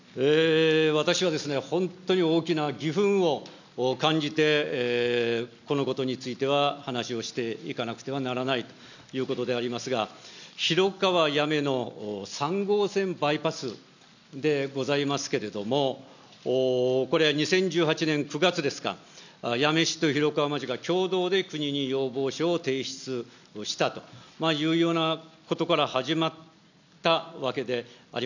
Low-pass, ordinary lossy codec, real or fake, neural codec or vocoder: 7.2 kHz; none; real; none